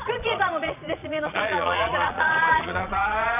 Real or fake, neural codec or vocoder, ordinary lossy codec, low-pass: fake; vocoder, 22.05 kHz, 80 mel bands, Vocos; none; 3.6 kHz